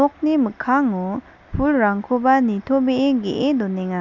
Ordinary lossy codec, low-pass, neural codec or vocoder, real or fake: none; 7.2 kHz; none; real